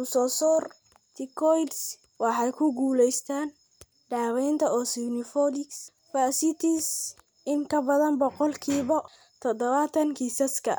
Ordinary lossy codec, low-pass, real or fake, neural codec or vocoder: none; none; real; none